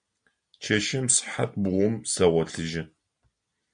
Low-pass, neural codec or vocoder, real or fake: 9.9 kHz; none; real